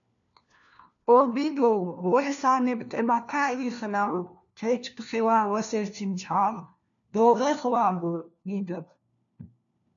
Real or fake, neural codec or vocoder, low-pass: fake; codec, 16 kHz, 1 kbps, FunCodec, trained on LibriTTS, 50 frames a second; 7.2 kHz